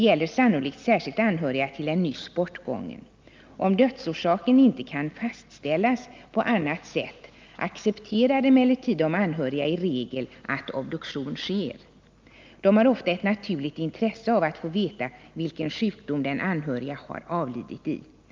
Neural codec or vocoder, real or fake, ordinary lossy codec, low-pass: none; real; Opus, 24 kbps; 7.2 kHz